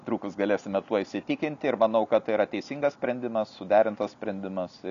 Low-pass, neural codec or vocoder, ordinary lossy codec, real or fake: 7.2 kHz; none; MP3, 96 kbps; real